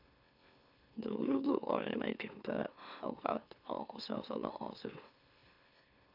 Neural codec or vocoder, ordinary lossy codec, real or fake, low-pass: autoencoder, 44.1 kHz, a latent of 192 numbers a frame, MeloTTS; none; fake; 5.4 kHz